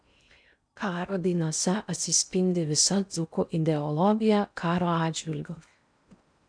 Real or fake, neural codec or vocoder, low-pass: fake; codec, 16 kHz in and 24 kHz out, 0.6 kbps, FocalCodec, streaming, 4096 codes; 9.9 kHz